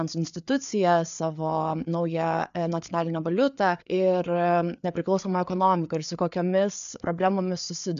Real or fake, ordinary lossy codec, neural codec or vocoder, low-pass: fake; AAC, 96 kbps; codec, 16 kHz, 4 kbps, FreqCodec, larger model; 7.2 kHz